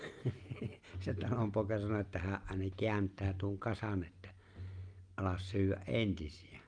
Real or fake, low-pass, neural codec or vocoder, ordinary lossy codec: real; 9.9 kHz; none; Opus, 32 kbps